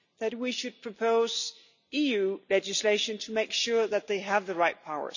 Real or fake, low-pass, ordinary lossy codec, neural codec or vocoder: real; 7.2 kHz; none; none